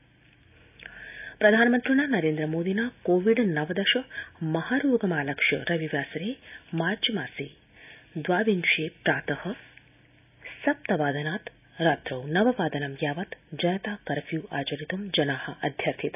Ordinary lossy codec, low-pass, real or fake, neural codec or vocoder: none; 3.6 kHz; real; none